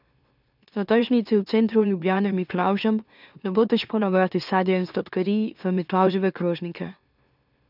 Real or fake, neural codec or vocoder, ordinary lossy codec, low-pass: fake; autoencoder, 44.1 kHz, a latent of 192 numbers a frame, MeloTTS; none; 5.4 kHz